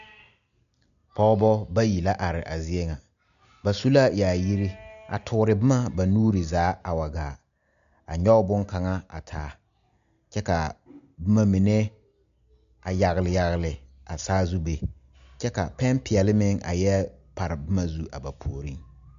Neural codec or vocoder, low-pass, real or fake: none; 7.2 kHz; real